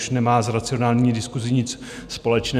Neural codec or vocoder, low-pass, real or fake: none; 14.4 kHz; real